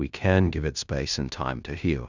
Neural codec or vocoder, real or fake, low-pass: codec, 16 kHz in and 24 kHz out, 0.9 kbps, LongCat-Audio-Codec, fine tuned four codebook decoder; fake; 7.2 kHz